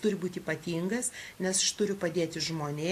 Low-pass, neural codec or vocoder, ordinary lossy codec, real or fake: 14.4 kHz; none; AAC, 64 kbps; real